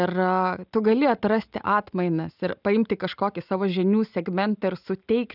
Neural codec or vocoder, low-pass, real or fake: none; 5.4 kHz; real